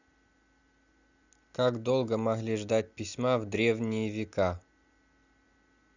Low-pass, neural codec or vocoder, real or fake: 7.2 kHz; none; real